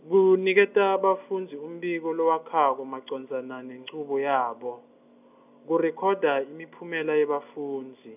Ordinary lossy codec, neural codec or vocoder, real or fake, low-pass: none; none; real; 3.6 kHz